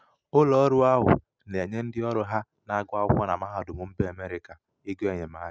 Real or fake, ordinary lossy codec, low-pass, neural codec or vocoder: real; none; none; none